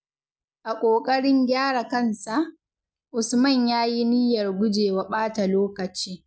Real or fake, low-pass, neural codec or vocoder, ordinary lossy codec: real; none; none; none